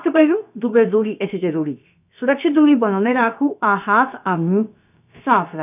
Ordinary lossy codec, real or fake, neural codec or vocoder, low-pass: none; fake; codec, 16 kHz, about 1 kbps, DyCAST, with the encoder's durations; 3.6 kHz